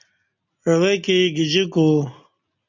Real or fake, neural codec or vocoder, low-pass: real; none; 7.2 kHz